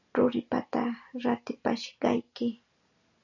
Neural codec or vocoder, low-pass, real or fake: none; 7.2 kHz; real